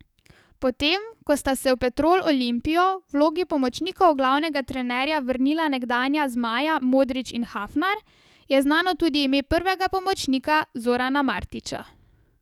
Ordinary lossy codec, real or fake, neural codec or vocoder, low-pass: none; fake; codec, 44.1 kHz, 7.8 kbps, DAC; 19.8 kHz